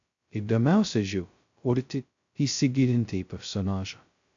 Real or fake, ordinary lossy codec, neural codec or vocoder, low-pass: fake; AAC, 64 kbps; codec, 16 kHz, 0.2 kbps, FocalCodec; 7.2 kHz